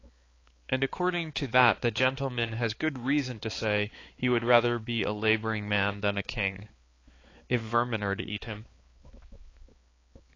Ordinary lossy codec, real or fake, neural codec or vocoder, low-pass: AAC, 32 kbps; fake; codec, 16 kHz, 4 kbps, X-Codec, HuBERT features, trained on balanced general audio; 7.2 kHz